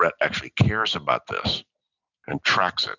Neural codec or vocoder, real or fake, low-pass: none; real; 7.2 kHz